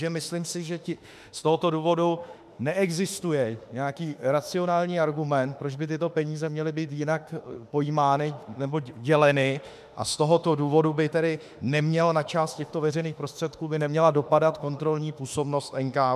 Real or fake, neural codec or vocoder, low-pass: fake; autoencoder, 48 kHz, 32 numbers a frame, DAC-VAE, trained on Japanese speech; 14.4 kHz